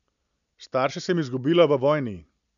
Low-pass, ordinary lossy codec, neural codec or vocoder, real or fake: 7.2 kHz; none; none; real